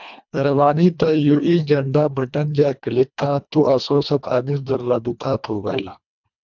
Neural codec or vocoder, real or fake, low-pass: codec, 24 kHz, 1.5 kbps, HILCodec; fake; 7.2 kHz